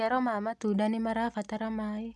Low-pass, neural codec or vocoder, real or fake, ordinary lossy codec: none; none; real; none